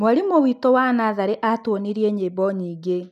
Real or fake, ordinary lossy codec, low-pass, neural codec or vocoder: real; none; 14.4 kHz; none